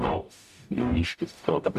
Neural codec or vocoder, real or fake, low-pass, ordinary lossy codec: codec, 44.1 kHz, 0.9 kbps, DAC; fake; 14.4 kHz; MP3, 96 kbps